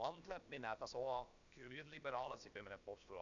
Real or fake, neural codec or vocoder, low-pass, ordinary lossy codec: fake; codec, 16 kHz, about 1 kbps, DyCAST, with the encoder's durations; 7.2 kHz; none